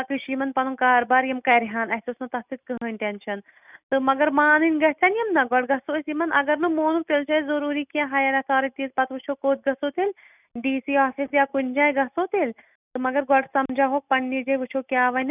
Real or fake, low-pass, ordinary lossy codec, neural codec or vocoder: real; 3.6 kHz; none; none